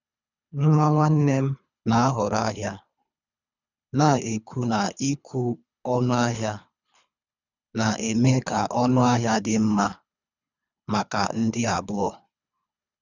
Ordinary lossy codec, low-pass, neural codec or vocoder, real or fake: none; 7.2 kHz; codec, 24 kHz, 3 kbps, HILCodec; fake